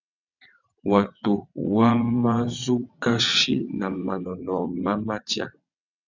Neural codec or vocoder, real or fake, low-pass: vocoder, 22.05 kHz, 80 mel bands, WaveNeXt; fake; 7.2 kHz